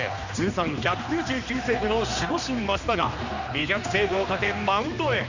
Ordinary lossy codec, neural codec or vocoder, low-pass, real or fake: none; codec, 16 kHz, 2 kbps, X-Codec, HuBERT features, trained on general audio; 7.2 kHz; fake